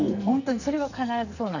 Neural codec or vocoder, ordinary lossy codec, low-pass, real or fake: codec, 44.1 kHz, 2.6 kbps, SNAC; none; 7.2 kHz; fake